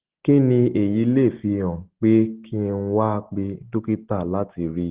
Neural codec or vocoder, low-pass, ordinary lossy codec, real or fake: none; 3.6 kHz; Opus, 16 kbps; real